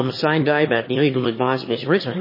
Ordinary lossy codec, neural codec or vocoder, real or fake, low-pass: MP3, 24 kbps; autoencoder, 22.05 kHz, a latent of 192 numbers a frame, VITS, trained on one speaker; fake; 5.4 kHz